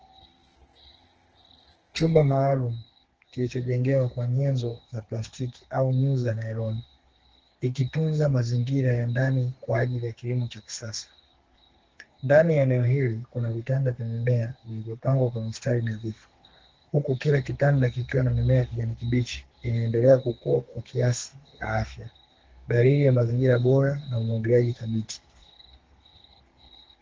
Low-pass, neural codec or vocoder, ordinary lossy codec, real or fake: 7.2 kHz; codec, 32 kHz, 1.9 kbps, SNAC; Opus, 16 kbps; fake